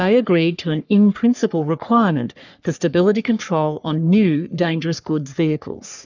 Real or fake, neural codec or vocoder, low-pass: fake; codec, 44.1 kHz, 3.4 kbps, Pupu-Codec; 7.2 kHz